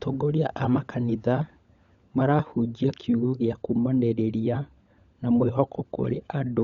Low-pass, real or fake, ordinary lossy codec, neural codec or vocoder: 7.2 kHz; fake; Opus, 64 kbps; codec, 16 kHz, 16 kbps, FunCodec, trained on LibriTTS, 50 frames a second